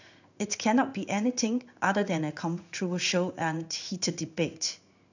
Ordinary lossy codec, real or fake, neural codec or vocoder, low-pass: none; fake; codec, 16 kHz in and 24 kHz out, 1 kbps, XY-Tokenizer; 7.2 kHz